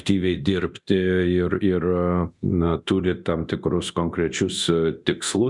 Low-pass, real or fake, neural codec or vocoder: 10.8 kHz; fake; codec, 24 kHz, 0.9 kbps, DualCodec